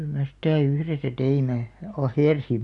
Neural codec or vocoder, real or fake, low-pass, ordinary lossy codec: none; real; 10.8 kHz; none